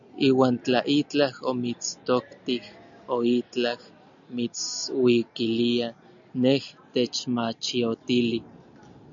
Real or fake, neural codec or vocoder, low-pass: real; none; 7.2 kHz